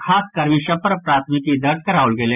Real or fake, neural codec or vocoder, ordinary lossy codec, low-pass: real; none; none; 3.6 kHz